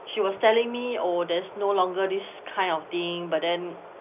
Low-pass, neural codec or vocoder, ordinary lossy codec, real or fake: 3.6 kHz; none; none; real